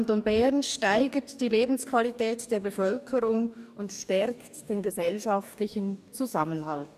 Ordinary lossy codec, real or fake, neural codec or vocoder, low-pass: none; fake; codec, 44.1 kHz, 2.6 kbps, DAC; 14.4 kHz